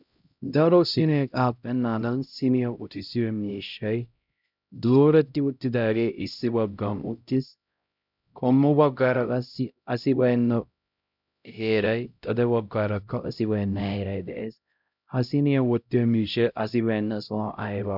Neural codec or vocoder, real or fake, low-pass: codec, 16 kHz, 0.5 kbps, X-Codec, HuBERT features, trained on LibriSpeech; fake; 5.4 kHz